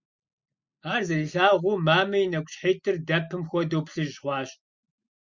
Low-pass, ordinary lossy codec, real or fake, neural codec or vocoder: 7.2 kHz; Opus, 64 kbps; real; none